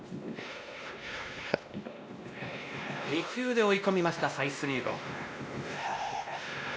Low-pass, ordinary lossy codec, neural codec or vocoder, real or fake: none; none; codec, 16 kHz, 1 kbps, X-Codec, WavLM features, trained on Multilingual LibriSpeech; fake